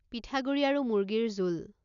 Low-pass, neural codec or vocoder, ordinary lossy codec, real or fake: 7.2 kHz; none; none; real